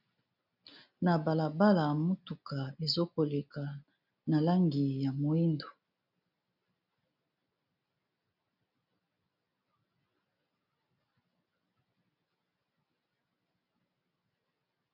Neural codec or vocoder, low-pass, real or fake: none; 5.4 kHz; real